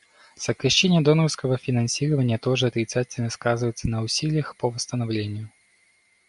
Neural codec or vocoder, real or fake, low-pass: none; real; 10.8 kHz